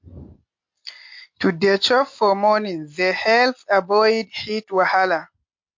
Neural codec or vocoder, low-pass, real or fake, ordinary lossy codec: none; 7.2 kHz; real; MP3, 48 kbps